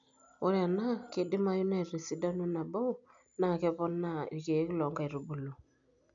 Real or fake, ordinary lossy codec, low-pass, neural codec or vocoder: real; none; 7.2 kHz; none